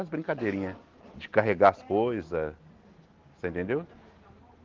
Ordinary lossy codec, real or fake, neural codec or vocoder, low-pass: Opus, 16 kbps; real; none; 7.2 kHz